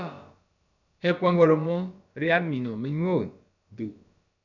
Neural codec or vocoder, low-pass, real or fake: codec, 16 kHz, about 1 kbps, DyCAST, with the encoder's durations; 7.2 kHz; fake